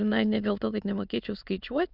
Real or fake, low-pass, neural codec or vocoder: fake; 5.4 kHz; autoencoder, 22.05 kHz, a latent of 192 numbers a frame, VITS, trained on many speakers